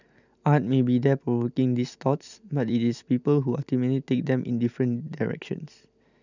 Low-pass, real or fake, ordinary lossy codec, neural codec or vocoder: 7.2 kHz; real; none; none